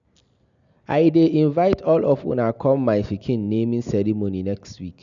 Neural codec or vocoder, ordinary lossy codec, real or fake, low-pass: none; none; real; 7.2 kHz